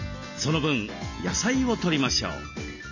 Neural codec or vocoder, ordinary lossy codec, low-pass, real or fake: none; none; 7.2 kHz; real